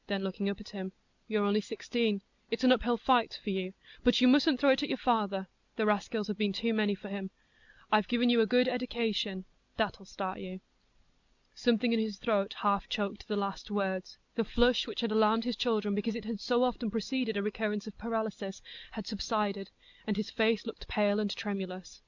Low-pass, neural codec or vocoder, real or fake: 7.2 kHz; none; real